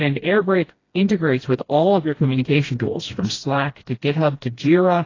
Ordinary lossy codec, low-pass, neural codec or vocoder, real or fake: AAC, 32 kbps; 7.2 kHz; codec, 16 kHz, 1 kbps, FreqCodec, smaller model; fake